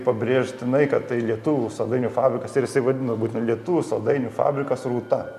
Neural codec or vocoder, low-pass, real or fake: none; 14.4 kHz; real